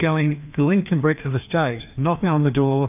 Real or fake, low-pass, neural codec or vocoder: fake; 3.6 kHz; codec, 16 kHz, 2 kbps, FreqCodec, larger model